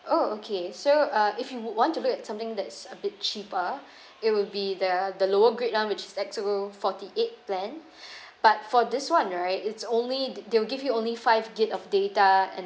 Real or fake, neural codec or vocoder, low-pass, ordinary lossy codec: real; none; none; none